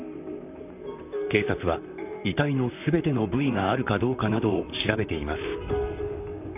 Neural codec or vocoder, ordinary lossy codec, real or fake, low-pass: vocoder, 44.1 kHz, 128 mel bands, Pupu-Vocoder; none; fake; 3.6 kHz